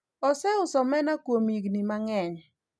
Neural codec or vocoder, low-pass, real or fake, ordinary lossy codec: none; none; real; none